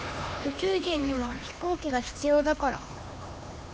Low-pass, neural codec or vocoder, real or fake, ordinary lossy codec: none; codec, 16 kHz, 2 kbps, X-Codec, HuBERT features, trained on LibriSpeech; fake; none